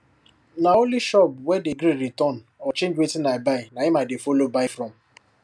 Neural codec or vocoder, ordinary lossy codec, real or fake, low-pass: none; none; real; none